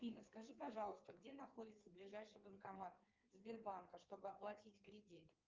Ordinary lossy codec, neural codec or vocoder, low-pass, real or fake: Opus, 24 kbps; codec, 16 kHz in and 24 kHz out, 1.1 kbps, FireRedTTS-2 codec; 7.2 kHz; fake